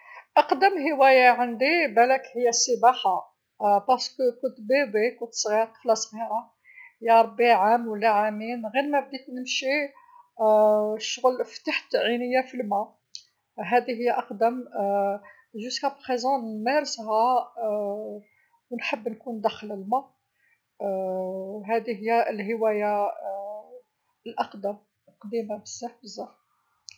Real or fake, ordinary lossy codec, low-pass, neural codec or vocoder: real; none; none; none